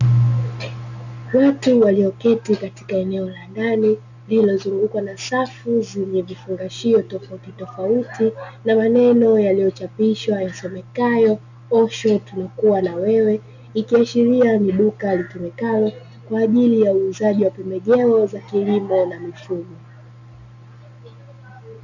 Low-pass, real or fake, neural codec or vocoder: 7.2 kHz; real; none